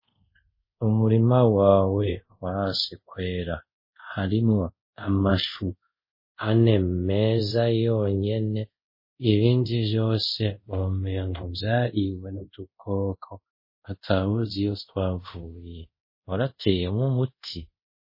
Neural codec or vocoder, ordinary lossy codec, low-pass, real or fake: codec, 24 kHz, 0.5 kbps, DualCodec; MP3, 24 kbps; 5.4 kHz; fake